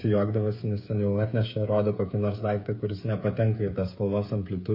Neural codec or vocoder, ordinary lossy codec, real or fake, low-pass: codec, 16 kHz, 8 kbps, FreqCodec, smaller model; MP3, 32 kbps; fake; 5.4 kHz